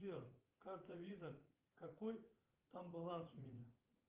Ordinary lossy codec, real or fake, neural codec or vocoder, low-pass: Opus, 32 kbps; fake; vocoder, 44.1 kHz, 80 mel bands, Vocos; 3.6 kHz